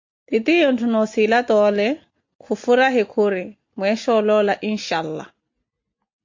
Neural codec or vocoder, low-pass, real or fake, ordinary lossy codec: none; 7.2 kHz; real; MP3, 48 kbps